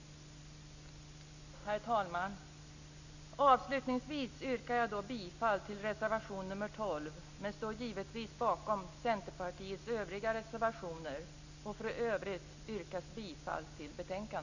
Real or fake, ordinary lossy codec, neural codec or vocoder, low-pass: real; none; none; 7.2 kHz